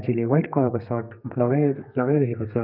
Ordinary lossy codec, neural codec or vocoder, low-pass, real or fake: none; codec, 32 kHz, 1.9 kbps, SNAC; 5.4 kHz; fake